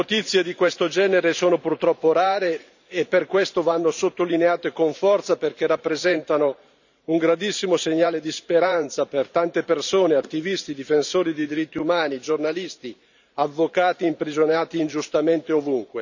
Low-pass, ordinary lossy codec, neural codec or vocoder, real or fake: 7.2 kHz; none; vocoder, 44.1 kHz, 128 mel bands every 512 samples, BigVGAN v2; fake